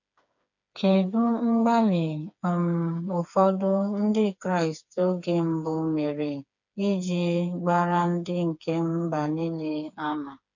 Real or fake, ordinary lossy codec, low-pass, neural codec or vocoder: fake; none; 7.2 kHz; codec, 16 kHz, 4 kbps, FreqCodec, smaller model